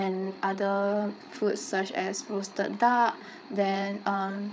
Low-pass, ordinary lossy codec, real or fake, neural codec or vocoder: none; none; fake; codec, 16 kHz, 8 kbps, FreqCodec, larger model